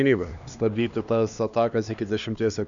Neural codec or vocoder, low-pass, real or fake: codec, 16 kHz, 2 kbps, X-Codec, HuBERT features, trained on LibriSpeech; 7.2 kHz; fake